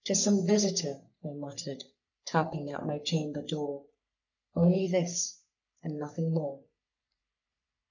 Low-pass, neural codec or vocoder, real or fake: 7.2 kHz; codec, 44.1 kHz, 3.4 kbps, Pupu-Codec; fake